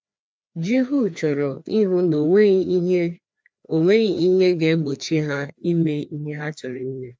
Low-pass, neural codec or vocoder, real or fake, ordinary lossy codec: none; codec, 16 kHz, 2 kbps, FreqCodec, larger model; fake; none